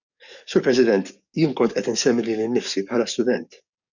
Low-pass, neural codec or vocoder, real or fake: 7.2 kHz; codec, 44.1 kHz, 7.8 kbps, DAC; fake